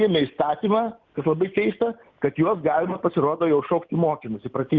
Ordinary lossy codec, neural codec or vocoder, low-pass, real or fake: Opus, 24 kbps; none; 7.2 kHz; real